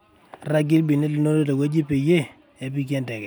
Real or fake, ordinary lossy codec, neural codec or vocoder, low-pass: real; none; none; none